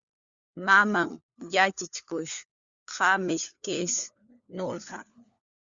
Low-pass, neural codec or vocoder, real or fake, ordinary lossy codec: 7.2 kHz; codec, 16 kHz, 4 kbps, FunCodec, trained on LibriTTS, 50 frames a second; fake; Opus, 64 kbps